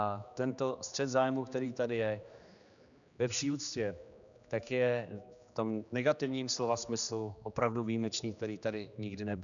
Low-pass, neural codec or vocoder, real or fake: 7.2 kHz; codec, 16 kHz, 2 kbps, X-Codec, HuBERT features, trained on general audio; fake